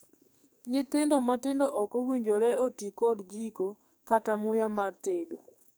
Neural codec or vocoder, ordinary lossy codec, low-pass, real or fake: codec, 44.1 kHz, 2.6 kbps, SNAC; none; none; fake